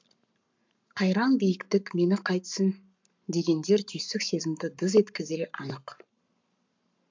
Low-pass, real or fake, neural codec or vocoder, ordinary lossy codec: 7.2 kHz; fake; codec, 44.1 kHz, 7.8 kbps, Pupu-Codec; MP3, 64 kbps